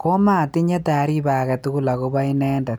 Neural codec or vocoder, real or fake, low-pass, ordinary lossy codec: none; real; none; none